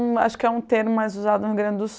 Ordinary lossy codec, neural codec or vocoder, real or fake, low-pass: none; none; real; none